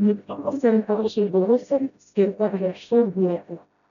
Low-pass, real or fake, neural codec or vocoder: 7.2 kHz; fake; codec, 16 kHz, 0.5 kbps, FreqCodec, smaller model